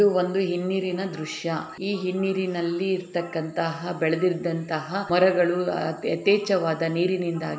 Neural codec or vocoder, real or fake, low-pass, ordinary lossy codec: none; real; none; none